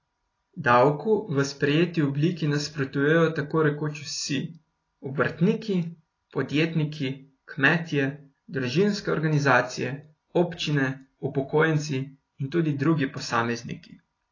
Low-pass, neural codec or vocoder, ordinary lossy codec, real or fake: 7.2 kHz; none; AAC, 32 kbps; real